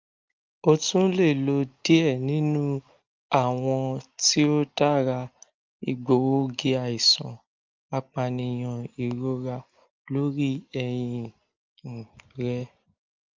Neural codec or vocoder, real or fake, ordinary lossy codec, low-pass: none; real; Opus, 32 kbps; 7.2 kHz